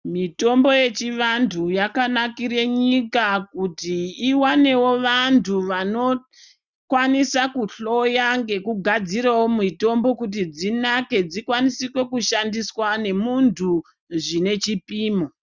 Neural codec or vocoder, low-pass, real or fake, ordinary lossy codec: none; 7.2 kHz; real; Opus, 64 kbps